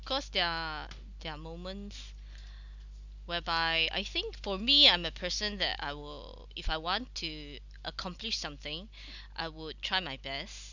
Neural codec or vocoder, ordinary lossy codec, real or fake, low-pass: none; none; real; 7.2 kHz